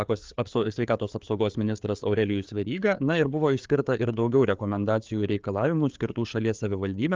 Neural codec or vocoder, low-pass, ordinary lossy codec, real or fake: codec, 16 kHz, 4 kbps, FreqCodec, larger model; 7.2 kHz; Opus, 32 kbps; fake